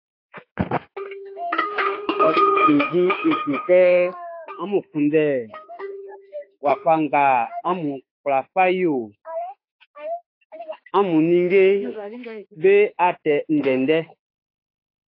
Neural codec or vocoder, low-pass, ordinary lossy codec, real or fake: autoencoder, 48 kHz, 32 numbers a frame, DAC-VAE, trained on Japanese speech; 5.4 kHz; AAC, 32 kbps; fake